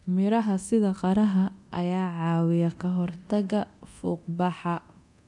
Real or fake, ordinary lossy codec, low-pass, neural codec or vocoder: fake; none; 10.8 kHz; codec, 24 kHz, 0.9 kbps, DualCodec